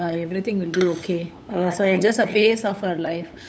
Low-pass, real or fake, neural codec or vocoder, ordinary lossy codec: none; fake; codec, 16 kHz, 8 kbps, FunCodec, trained on LibriTTS, 25 frames a second; none